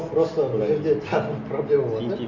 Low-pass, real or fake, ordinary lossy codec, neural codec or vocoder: 7.2 kHz; real; none; none